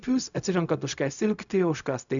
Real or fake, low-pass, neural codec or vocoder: fake; 7.2 kHz; codec, 16 kHz, 0.4 kbps, LongCat-Audio-Codec